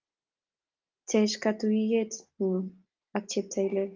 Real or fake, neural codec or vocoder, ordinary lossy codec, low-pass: real; none; Opus, 24 kbps; 7.2 kHz